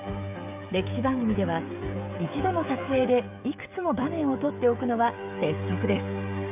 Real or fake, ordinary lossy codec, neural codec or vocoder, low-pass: fake; AAC, 32 kbps; codec, 16 kHz, 16 kbps, FreqCodec, smaller model; 3.6 kHz